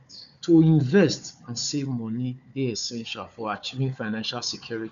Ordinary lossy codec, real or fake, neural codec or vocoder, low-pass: none; fake; codec, 16 kHz, 4 kbps, FunCodec, trained on Chinese and English, 50 frames a second; 7.2 kHz